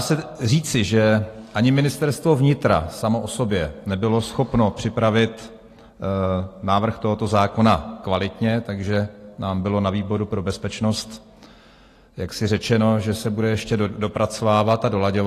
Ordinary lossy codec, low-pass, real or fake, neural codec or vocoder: AAC, 48 kbps; 14.4 kHz; real; none